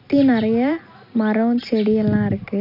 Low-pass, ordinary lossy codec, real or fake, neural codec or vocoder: 5.4 kHz; none; real; none